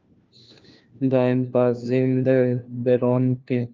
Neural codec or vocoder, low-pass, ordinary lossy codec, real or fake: codec, 16 kHz, 1 kbps, FunCodec, trained on LibriTTS, 50 frames a second; 7.2 kHz; Opus, 24 kbps; fake